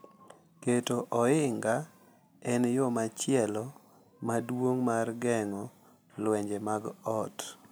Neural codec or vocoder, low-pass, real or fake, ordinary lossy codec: none; none; real; none